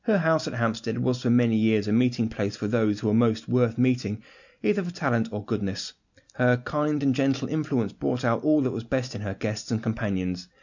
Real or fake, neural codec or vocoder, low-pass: real; none; 7.2 kHz